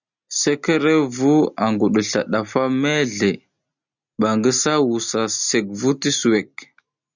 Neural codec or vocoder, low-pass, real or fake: none; 7.2 kHz; real